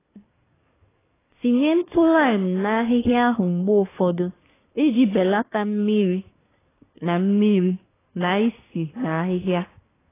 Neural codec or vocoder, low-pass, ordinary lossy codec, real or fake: codec, 24 kHz, 1 kbps, SNAC; 3.6 kHz; AAC, 16 kbps; fake